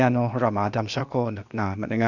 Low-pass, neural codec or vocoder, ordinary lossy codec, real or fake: 7.2 kHz; codec, 16 kHz, 0.8 kbps, ZipCodec; none; fake